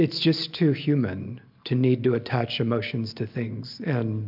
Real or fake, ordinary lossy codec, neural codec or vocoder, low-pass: real; MP3, 48 kbps; none; 5.4 kHz